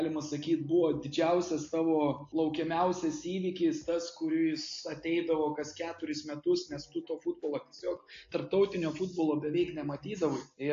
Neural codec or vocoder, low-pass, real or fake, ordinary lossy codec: none; 7.2 kHz; real; AAC, 48 kbps